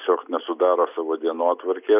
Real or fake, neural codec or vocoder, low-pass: real; none; 3.6 kHz